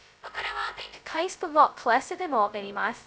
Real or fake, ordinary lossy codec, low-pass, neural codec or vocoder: fake; none; none; codec, 16 kHz, 0.2 kbps, FocalCodec